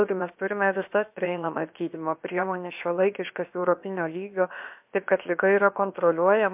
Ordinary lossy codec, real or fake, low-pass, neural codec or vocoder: MP3, 32 kbps; fake; 3.6 kHz; codec, 16 kHz, 0.7 kbps, FocalCodec